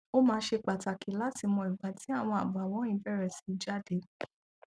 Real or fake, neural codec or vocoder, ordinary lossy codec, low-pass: real; none; none; none